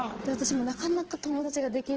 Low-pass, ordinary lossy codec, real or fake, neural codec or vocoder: 7.2 kHz; Opus, 16 kbps; fake; vocoder, 22.05 kHz, 80 mel bands, Vocos